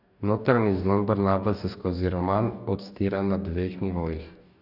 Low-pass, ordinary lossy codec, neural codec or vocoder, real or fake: 5.4 kHz; none; codec, 44.1 kHz, 2.6 kbps, DAC; fake